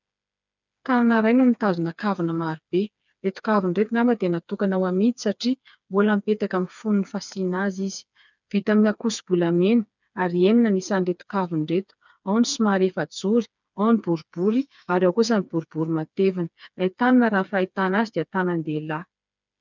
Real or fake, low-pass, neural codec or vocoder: fake; 7.2 kHz; codec, 16 kHz, 4 kbps, FreqCodec, smaller model